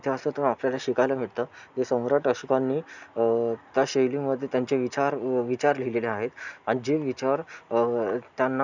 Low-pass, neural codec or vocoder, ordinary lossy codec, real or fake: 7.2 kHz; none; none; real